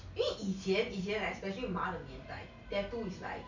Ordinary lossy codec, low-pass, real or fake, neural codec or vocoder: none; 7.2 kHz; real; none